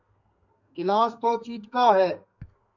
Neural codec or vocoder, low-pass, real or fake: codec, 32 kHz, 1.9 kbps, SNAC; 7.2 kHz; fake